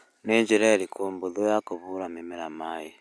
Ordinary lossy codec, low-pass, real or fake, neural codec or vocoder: none; none; real; none